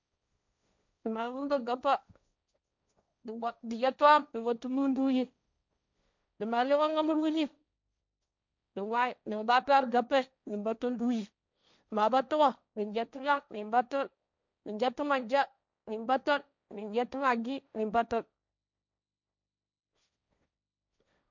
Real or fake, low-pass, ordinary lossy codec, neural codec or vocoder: fake; 7.2 kHz; none; codec, 16 kHz, 1.1 kbps, Voila-Tokenizer